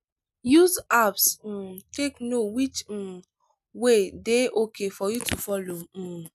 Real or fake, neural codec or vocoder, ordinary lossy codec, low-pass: real; none; none; 14.4 kHz